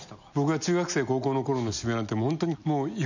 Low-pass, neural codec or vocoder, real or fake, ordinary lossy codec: 7.2 kHz; none; real; none